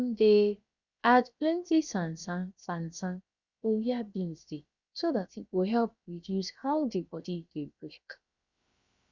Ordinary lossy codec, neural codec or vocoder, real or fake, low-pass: Opus, 64 kbps; codec, 16 kHz, 0.7 kbps, FocalCodec; fake; 7.2 kHz